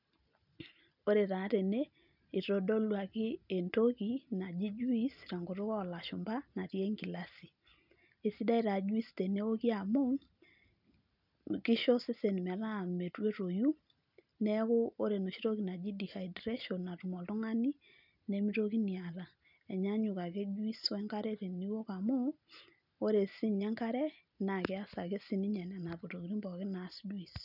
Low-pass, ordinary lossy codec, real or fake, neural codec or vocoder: 5.4 kHz; none; real; none